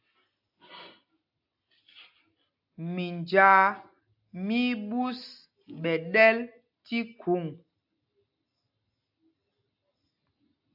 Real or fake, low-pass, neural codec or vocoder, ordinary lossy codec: real; 5.4 kHz; none; AAC, 48 kbps